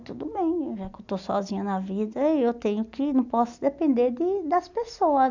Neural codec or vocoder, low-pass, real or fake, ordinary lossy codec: none; 7.2 kHz; real; none